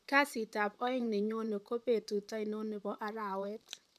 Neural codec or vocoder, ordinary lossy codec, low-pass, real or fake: vocoder, 44.1 kHz, 128 mel bands, Pupu-Vocoder; none; 14.4 kHz; fake